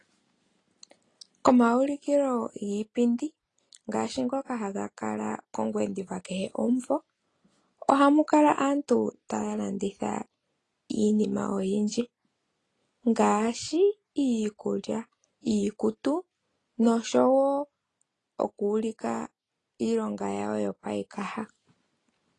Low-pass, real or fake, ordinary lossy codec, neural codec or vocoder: 10.8 kHz; real; AAC, 32 kbps; none